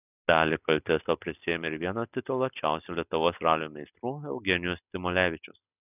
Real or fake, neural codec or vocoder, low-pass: real; none; 3.6 kHz